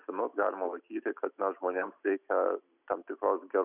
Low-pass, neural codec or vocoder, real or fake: 3.6 kHz; none; real